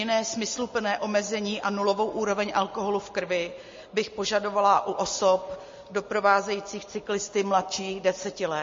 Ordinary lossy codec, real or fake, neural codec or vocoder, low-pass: MP3, 32 kbps; real; none; 7.2 kHz